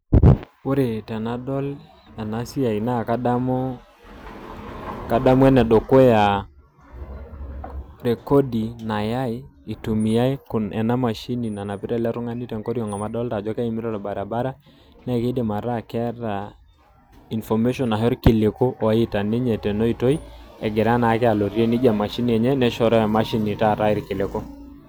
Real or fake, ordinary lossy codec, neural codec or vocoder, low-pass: real; none; none; none